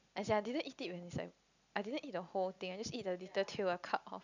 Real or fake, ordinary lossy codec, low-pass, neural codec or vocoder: real; none; 7.2 kHz; none